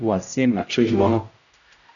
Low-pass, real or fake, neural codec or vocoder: 7.2 kHz; fake; codec, 16 kHz, 0.5 kbps, X-Codec, HuBERT features, trained on general audio